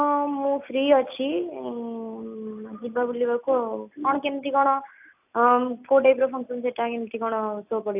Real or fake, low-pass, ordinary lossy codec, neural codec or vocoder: real; 3.6 kHz; AAC, 32 kbps; none